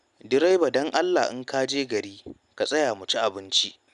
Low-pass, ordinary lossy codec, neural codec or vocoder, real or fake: 10.8 kHz; none; none; real